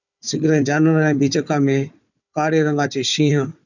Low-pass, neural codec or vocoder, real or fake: 7.2 kHz; codec, 16 kHz, 4 kbps, FunCodec, trained on Chinese and English, 50 frames a second; fake